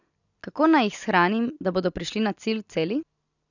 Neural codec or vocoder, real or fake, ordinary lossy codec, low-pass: none; real; none; 7.2 kHz